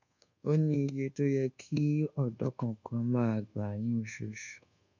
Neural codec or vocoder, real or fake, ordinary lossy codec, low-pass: codec, 24 kHz, 1.2 kbps, DualCodec; fake; MP3, 64 kbps; 7.2 kHz